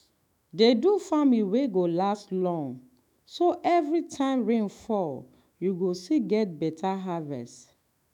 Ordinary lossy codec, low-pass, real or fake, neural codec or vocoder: none; 19.8 kHz; fake; autoencoder, 48 kHz, 128 numbers a frame, DAC-VAE, trained on Japanese speech